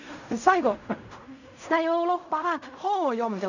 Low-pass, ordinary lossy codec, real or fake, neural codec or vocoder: 7.2 kHz; none; fake; codec, 16 kHz in and 24 kHz out, 0.4 kbps, LongCat-Audio-Codec, fine tuned four codebook decoder